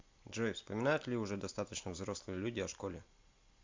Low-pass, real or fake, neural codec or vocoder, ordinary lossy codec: 7.2 kHz; real; none; MP3, 64 kbps